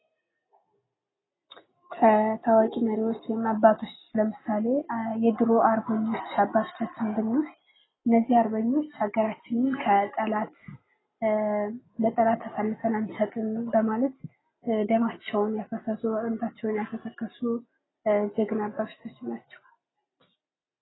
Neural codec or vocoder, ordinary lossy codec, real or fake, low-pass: none; AAC, 16 kbps; real; 7.2 kHz